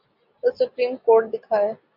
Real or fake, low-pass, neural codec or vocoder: real; 5.4 kHz; none